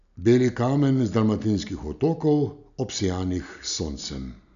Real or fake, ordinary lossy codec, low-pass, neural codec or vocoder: real; none; 7.2 kHz; none